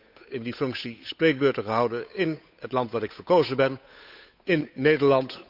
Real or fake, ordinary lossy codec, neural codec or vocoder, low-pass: fake; none; codec, 16 kHz, 8 kbps, FunCodec, trained on Chinese and English, 25 frames a second; 5.4 kHz